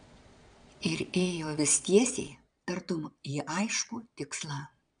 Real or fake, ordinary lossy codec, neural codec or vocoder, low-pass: fake; MP3, 96 kbps; vocoder, 22.05 kHz, 80 mel bands, Vocos; 9.9 kHz